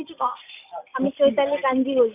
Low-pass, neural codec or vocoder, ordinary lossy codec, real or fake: 3.6 kHz; none; none; real